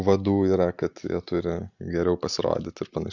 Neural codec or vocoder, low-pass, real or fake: none; 7.2 kHz; real